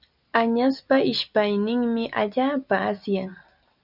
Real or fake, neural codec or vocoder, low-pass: real; none; 5.4 kHz